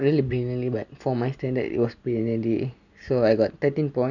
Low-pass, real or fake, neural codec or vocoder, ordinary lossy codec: 7.2 kHz; real; none; none